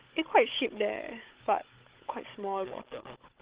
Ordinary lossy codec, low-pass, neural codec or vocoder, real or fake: Opus, 16 kbps; 3.6 kHz; codec, 16 kHz, 16 kbps, FunCodec, trained on Chinese and English, 50 frames a second; fake